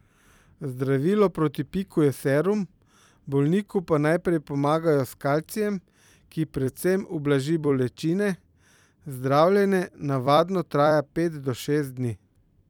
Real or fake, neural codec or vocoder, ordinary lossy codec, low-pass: fake; vocoder, 44.1 kHz, 128 mel bands every 256 samples, BigVGAN v2; none; 19.8 kHz